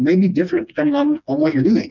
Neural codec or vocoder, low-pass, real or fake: codec, 16 kHz, 2 kbps, FreqCodec, smaller model; 7.2 kHz; fake